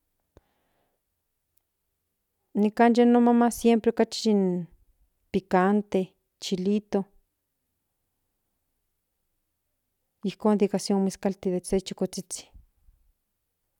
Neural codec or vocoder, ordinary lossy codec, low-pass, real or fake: none; none; 19.8 kHz; real